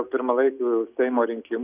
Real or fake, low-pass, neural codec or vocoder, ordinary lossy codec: real; 3.6 kHz; none; Opus, 64 kbps